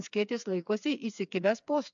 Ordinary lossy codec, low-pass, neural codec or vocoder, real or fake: MP3, 64 kbps; 7.2 kHz; codec, 16 kHz, 4 kbps, FreqCodec, smaller model; fake